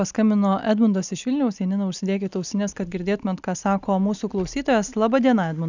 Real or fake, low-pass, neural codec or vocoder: real; 7.2 kHz; none